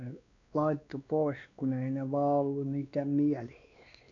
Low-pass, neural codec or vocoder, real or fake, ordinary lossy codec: 7.2 kHz; codec, 16 kHz, 2 kbps, X-Codec, WavLM features, trained on Multilingual LibriSpeech; fake; none